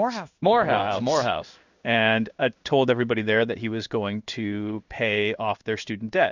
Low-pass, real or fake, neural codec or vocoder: 7.2 kHz; fake; codec, 16 kHz in and 24 kHz out, 1 kbps, XY-Tokenizer